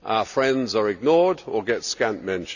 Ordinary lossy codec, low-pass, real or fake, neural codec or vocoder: none; 7.2 kHz; real; none